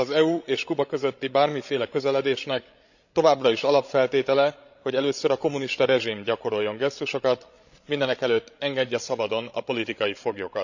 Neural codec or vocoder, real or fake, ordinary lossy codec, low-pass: codec, 16 kHz, 16 kbps, FreqCodec, larger model; fake; none; 7.2 kHz